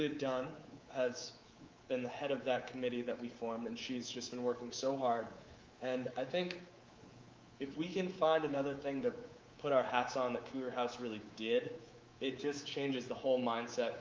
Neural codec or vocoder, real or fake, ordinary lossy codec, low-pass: codec, 24 kHz, 3.1 kbps, DualCodec; fake; Opus, 24 kbps; 7.2 kHz